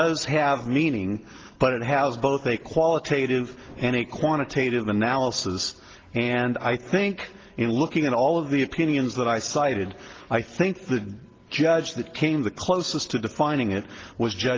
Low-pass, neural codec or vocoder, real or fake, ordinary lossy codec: 7.2 kHz; none; real; Opus, 16 kbps